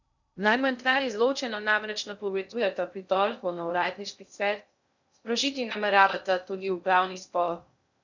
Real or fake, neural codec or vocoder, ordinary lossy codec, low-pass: fake; codec, 16 kHz in and 24 kHz out, 0.6 kbps, FocalCodec, streaming, 2048 codes; none; 7.2 kHz